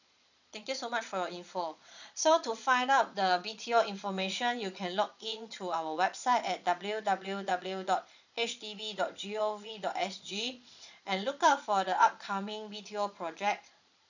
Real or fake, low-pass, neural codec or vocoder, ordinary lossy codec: fake; 7.2 kHz; vocoder, 22.05 kHz, 80 mel bands, Vocos; none